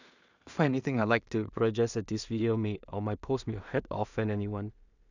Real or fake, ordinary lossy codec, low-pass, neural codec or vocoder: fake; none; 7.2 kHz; codec, 16 kHz in and 24 kHz out, 0.4 kbps, LongCat-Audio-Codec, two codebook decoder